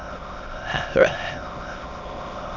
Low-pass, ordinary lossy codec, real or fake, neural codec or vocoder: 7.2 kHz; none; fake; autoencoder, 22.05 kHz, a latent of 192 numbers a frame, VITS, trained on many speakers